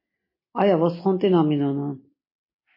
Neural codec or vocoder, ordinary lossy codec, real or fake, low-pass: none; MP3, 24 kbps; real; 5.4 kHz